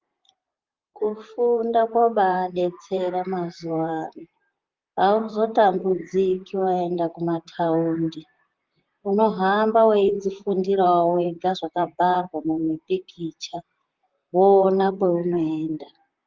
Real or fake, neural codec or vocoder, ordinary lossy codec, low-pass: fake; vocoder, 44.1 kHz, 128 mel bands, Pupu-Vocoder; Opus, 32 kbps; 7.2 kHz